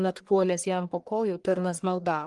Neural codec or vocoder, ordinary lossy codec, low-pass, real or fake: codec, 44.1 kHz, 1.7 kbps, Pupu-Codec; Opus, 24 kbps; 10.8 kHz; fake